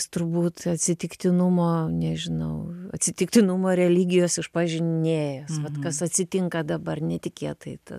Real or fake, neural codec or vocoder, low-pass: real; none; 14.4 kHz